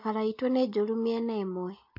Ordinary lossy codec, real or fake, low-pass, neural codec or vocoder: MP3, 32 kbps; real; 5.4 kHz; none